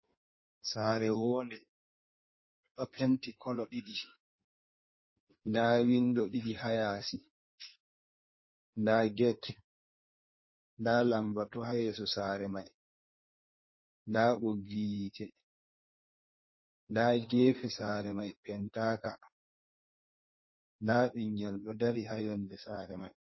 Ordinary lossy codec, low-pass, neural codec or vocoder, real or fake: MP3, 24 kbps; 7.2 kHz; codec, 16 kHz in and 24 kHz out, 1.1 kbps, FireRedTTS-2 codec; fake